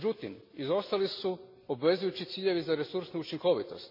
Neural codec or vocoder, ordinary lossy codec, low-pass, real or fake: none; MP3, 32 kbps; 5.4 kHz; real